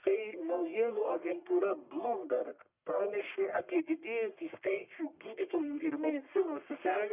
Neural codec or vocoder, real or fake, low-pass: codec, 44.1 kHz, 1.7 kbps, Pupu-Codec; fake; 3.6 kHz